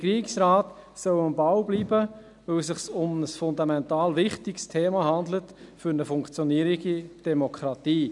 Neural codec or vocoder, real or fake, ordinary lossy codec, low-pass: none; real; none; 10.8 kHz